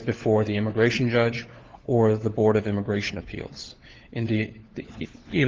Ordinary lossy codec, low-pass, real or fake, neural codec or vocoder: Opus, 16 kbps; 7.2 kHz; fake; codec, 16 kHz, 4 kbps, FunCodec, trained on LibriTTS, 50 frames a second